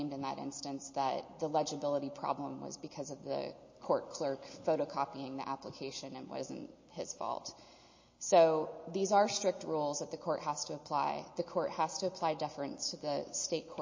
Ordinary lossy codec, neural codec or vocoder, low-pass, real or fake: MP3, 32 kbps; none; 7.2 kHz; real